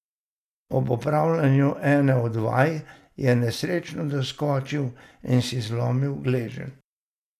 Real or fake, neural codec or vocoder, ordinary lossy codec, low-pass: real; none; none; 14.4 kHz